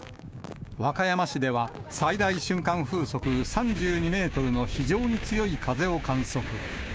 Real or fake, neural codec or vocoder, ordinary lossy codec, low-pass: fake; codec, 16 kHz, 6 kbps, DAC; none; none